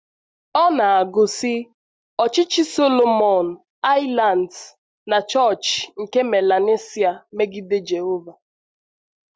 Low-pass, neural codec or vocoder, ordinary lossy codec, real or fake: none; none; none; real